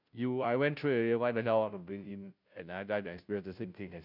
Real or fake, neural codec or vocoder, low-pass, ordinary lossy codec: fake; codec, 16 kHz, 0.5 kbps, FunCodec, trained on Chinese and English, 25 frames a second; 5.4 kHz; none